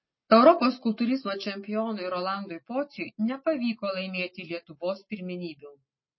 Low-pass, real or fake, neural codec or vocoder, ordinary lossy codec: 7.2 kHz; real; none; MP3, 24 kbps